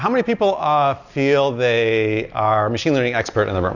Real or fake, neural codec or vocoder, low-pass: real; none; 7.2 kHz